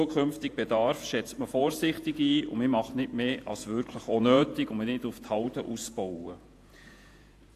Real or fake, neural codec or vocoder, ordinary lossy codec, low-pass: fake; vocoder, 48 kHz, 128 mel bands, Vocos; AAC, 64 kbps; 14.4 kHz